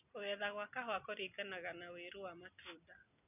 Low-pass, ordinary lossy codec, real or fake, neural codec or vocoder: 3.6 kHz; none; real; none